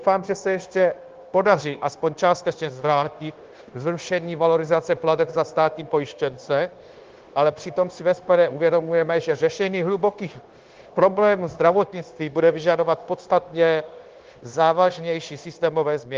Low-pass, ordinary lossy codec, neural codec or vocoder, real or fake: 7.2 kHz; Opus, 16 kbps; codec, 16 kHz, 0.9 kbps, LongCat-Audio-Codec; fake